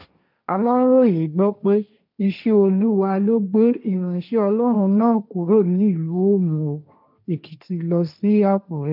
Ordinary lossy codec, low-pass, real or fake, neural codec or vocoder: none; 5.4 kHz; fake; codec, 16 kHz, 1.1 kbps, Voila-Tokenizer